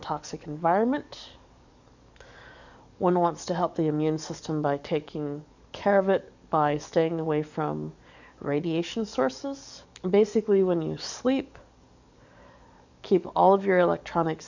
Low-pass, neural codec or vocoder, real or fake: 7.2 kHz; codec, 44.1 kHz, 7.8 kbps, DAC; fake